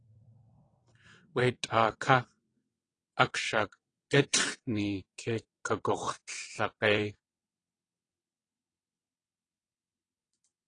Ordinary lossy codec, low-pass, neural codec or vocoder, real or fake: AAC, 32 kbps; 9.9 kHz; vocoder, 22.05 kHz, 80 mel bands, WaveNeXt; fake